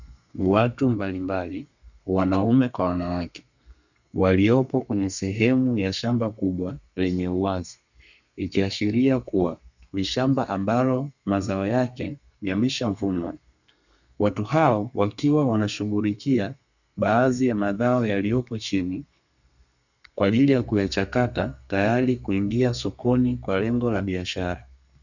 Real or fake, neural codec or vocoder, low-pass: fake; codec, 32 kHz, 1.9 kbps, SNAC; 7.2 kHz